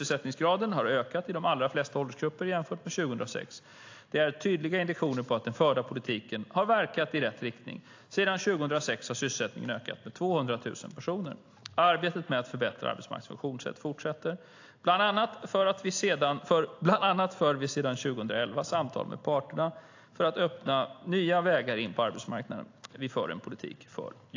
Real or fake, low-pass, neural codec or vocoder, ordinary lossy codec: real; 7.2 kHz; none; AAC, 48 kbps